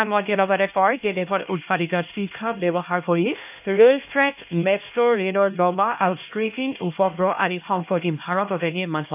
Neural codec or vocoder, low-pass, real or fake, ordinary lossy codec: codec, 16 kHz, 1 kbps, X-Codec, HuBERT features, trained on LibriSpeech; 3.6 kHz; fake; none